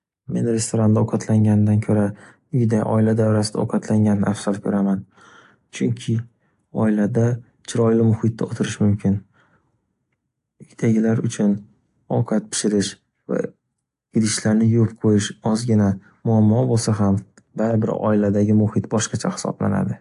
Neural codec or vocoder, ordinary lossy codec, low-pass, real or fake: none; AAC, 64 kbps; 10.8 kHz; real